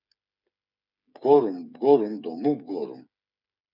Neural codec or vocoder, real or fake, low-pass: codec, 16 kHz, 8 kbps, FreqCodec, smaller model; fake; 5.4 kHz